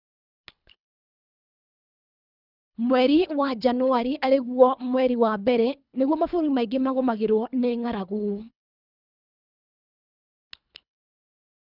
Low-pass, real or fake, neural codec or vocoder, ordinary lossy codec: 5.4 kHz; fake; codec, 24 kHz, 3 kbps, HILCodec; none